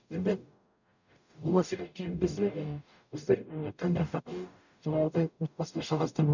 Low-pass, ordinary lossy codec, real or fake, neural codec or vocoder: 7.2 kHz; none; fake; codec, 44.1 kHz, 0.9 kbps, DAC